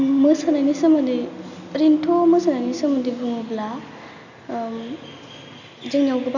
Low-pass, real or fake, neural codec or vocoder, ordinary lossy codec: 7.2 kHz; real; none; none